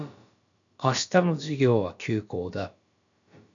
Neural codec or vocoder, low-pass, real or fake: codec, 16 kHz, about 1 kbps, DyCAST, with the encoder's durations; 7.2 kHz; fake